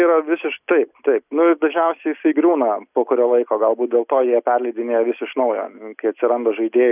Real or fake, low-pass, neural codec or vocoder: real; 3.6 kHz; none